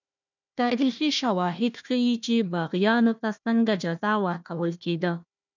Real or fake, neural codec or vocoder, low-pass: fake; codec, 16 kHz, 1 kbps, FunCodec, trained on Chinese and English, 50 frames a second; 7.2 kHz